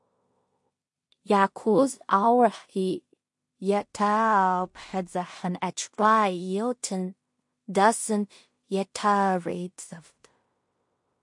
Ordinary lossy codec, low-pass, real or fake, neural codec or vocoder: MP3, 48 kbps; 10.8 kHz; fake; codec, 16 kHz in and 24 kHz out, 0.4 kbps, LongCat-Audio-Codec, two codebook decoder